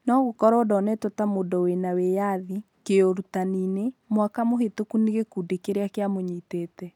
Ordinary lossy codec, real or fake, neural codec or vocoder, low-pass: none; real; none; 19.8 kHz